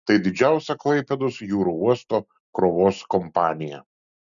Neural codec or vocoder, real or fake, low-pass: none; real; 7.2 kHz